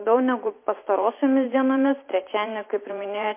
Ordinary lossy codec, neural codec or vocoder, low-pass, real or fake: MP3, 24 kbps; vocoder, 44.1 kHz, 128 mel bands every 512 samples, BigVGAN v2; 3.6 kHz; fake